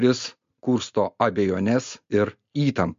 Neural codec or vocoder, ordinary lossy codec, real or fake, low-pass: none; MP3, 48 kbps; real; 7.2 kHz